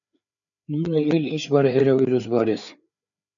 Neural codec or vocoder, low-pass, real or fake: codec, 16 kHz, 4 kbps, FreqCodec, larger model; 7.2 kHz; fake